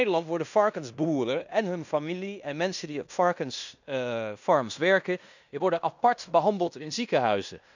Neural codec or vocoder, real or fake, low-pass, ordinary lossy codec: codec, 16 kHz in and 24 kHz out, 0.9 kbps, LongCat-Audio-Codec, fine tuned four codebook decoder; fake; 7.2 kHz; none